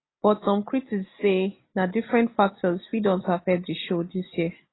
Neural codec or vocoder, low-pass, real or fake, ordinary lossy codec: none; 7.2 kHz; real; AAC, 16 kbps